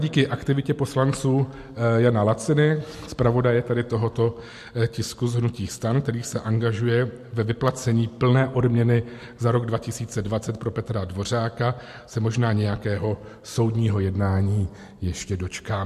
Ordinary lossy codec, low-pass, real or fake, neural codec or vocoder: MP3, 64 kbps; 14.4 kHz; real; none